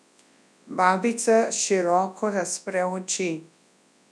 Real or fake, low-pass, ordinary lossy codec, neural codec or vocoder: fake; none; none; codec, 24 kHz, 0.9 kbps, WavTokenizer, large speech release